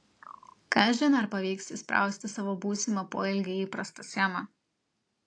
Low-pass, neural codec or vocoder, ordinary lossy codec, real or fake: 9.9 kHz; none; AAC, 48 kbps; real